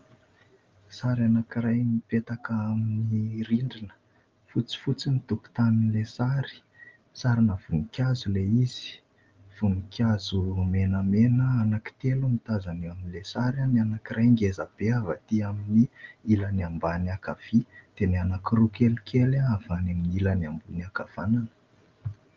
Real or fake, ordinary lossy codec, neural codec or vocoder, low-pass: real; Opus, 24 kbps; none; 7.2 kHz